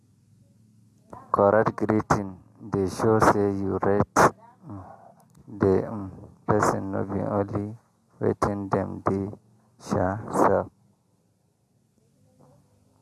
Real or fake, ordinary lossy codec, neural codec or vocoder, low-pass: real; AAC, 64 kbps; none; 14.4 kHz